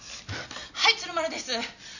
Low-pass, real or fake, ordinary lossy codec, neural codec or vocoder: 7.2 kHz; real; none; none